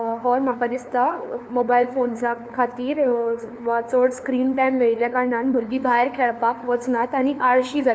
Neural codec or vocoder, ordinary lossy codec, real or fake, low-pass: codec, 16 kHz, 2 kbps, FunCodec, trained on LibriTTS, 25 frames a second; none; fake; none